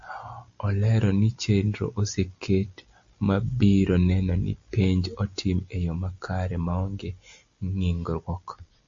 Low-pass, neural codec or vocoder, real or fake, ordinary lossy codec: 7.2 kHz; none; real; MP3, 48 kbps